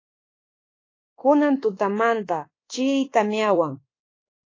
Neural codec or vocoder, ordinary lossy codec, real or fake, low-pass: codec, 24 kHz, 1.2 kbps, DualCodec; AAC, 32 kbps; fake; 7.2 kHz